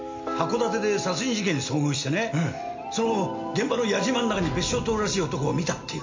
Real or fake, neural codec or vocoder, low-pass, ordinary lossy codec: real; none; 7.2 kHz; MP3, 48 kbps